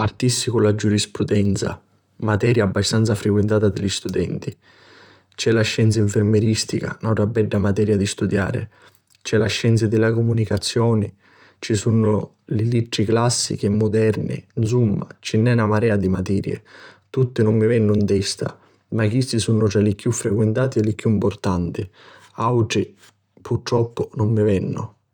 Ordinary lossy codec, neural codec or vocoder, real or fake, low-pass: none; vocoder, 44.1 kHz, 128 mel bands, Pupu-Vocoder; fake; 19.8 kHz